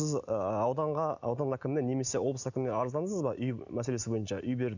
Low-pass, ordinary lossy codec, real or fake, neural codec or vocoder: 7.2 kHz; none; real; none